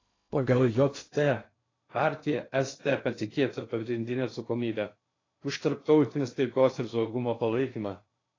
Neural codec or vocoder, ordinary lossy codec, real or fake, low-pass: codec, 16 kHz in and 24 kHz out, 0.6 kbps, FocalCodec, streaming, 2048 codes; AAC, 32 kbps; fake; 7.2 kHz